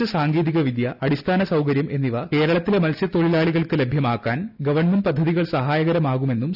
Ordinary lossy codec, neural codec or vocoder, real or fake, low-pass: none; none; real; 5.4 kHz